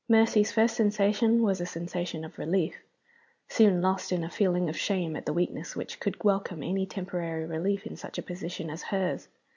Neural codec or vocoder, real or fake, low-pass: none; real; 7.2 kHz